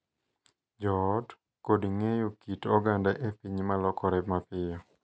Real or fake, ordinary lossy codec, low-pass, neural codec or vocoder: real; none; none; none